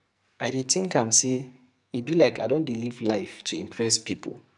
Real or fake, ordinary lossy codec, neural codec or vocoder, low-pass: fake; none; codec, 44.1 kHz, 2.6 kbps, SNAC; 10.8 kHz